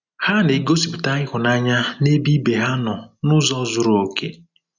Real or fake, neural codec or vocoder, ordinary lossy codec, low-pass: real; none; none; 7.2 kHz